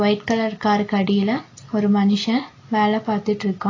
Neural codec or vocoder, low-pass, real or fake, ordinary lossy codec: none; 7.2 kHz; real; AAC, 32 kbps